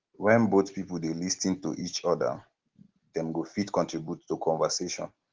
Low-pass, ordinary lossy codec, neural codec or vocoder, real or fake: 7.2 kHz; Opus, 16 kbps; none; real